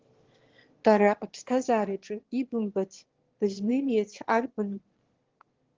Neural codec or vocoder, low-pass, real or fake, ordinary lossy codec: autoencoder, 22.05 kHz, a latent of 192 numbers a frame, VITS, trained on one speaker; 7.2 kHz; fake; Opus, 16 kbps